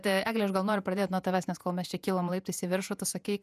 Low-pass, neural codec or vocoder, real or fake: 14.4 kHz; vocoder, 48 kHz, 128 mel bands, Vocos; fake